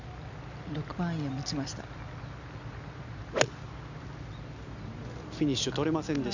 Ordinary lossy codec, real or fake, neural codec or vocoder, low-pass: none; real; none; 7.2 kHz